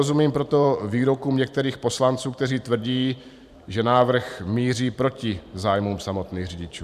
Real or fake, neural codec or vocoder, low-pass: fake; vocoder, 44.1 kHz, 128 mel bands every 512 samples, BigVGAN v2; 14.4 kHz